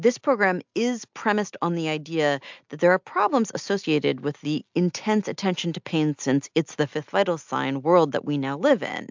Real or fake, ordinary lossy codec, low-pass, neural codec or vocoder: real; MP3, 64 kbps; 7.2 kHz; none